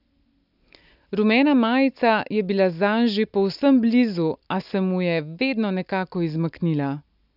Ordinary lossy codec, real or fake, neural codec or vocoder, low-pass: none; real; none; 5.4 kHz